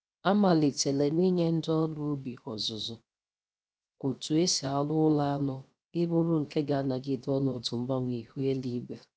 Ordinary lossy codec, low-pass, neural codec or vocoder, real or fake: none; none; codec, 16 kHz, 0.7 kbps, FocalCodec; fake